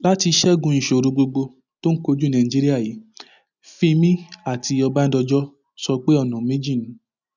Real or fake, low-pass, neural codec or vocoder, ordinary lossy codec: real; 7.2 kHz; none; none